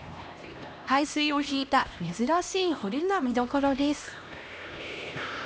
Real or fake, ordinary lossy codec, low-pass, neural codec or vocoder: fake; none; none; codec, 16 kHz, 1 kbps, X-Codec, HuBERT features, trained on LibriSpeech